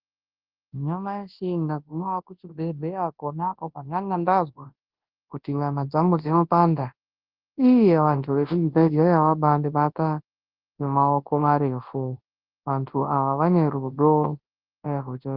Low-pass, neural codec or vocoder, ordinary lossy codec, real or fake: 5.4 kHz; codec, 24 kHz, 0.9 kbps, WavTokenizer, large speech release; Opus, 16 kbps; fake